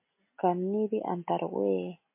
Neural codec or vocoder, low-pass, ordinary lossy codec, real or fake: none; 3.6 kHz; MP3, 24 kbps; real